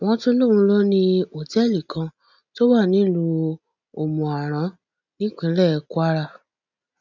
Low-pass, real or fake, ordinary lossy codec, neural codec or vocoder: 7.2 kHz; real; none; none